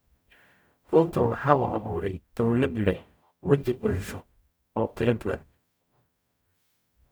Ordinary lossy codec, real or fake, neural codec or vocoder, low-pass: none; fake; codec, 44.1 kHz, 0.9 kbps, DAC; none